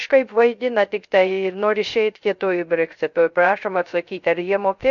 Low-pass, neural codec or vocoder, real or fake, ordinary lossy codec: 7.2 kHz; codec, 16 kHz, 0.3 kbps, FocalCodec; fake; MP3, 48 kbps